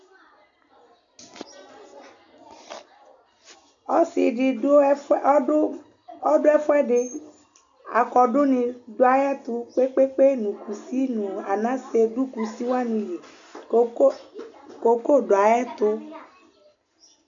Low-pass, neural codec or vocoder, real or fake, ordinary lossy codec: 7.2 kHz; none; real; AAC, 64 kbps